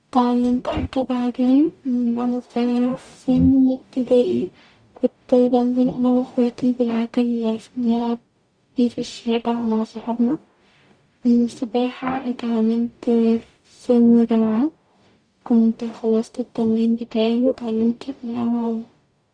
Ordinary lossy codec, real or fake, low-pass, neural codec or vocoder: Opus, 64 kbps; fake; 9.9 kHz; codec, 44.1 kHz, 0.9 kbps, DAC